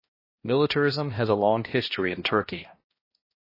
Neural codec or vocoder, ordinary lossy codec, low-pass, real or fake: codec, 16 kHz, 1 kbps, X-Codec, HuBERT features, trained on balanced general audio; MP3, 24 kbps; 5.4 kHz; fake